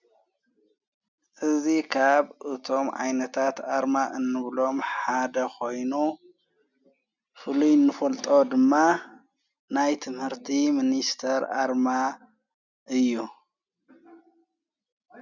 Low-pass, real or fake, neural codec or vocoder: 7.2 kHz; real; none